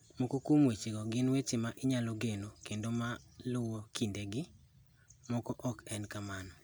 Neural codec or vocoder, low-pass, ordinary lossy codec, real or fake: none; none; none; real